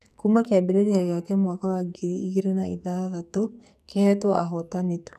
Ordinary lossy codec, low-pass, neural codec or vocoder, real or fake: none; 14.4 kHz; codec, 44.1 kHz, 2.6 kbps, SNAC; fake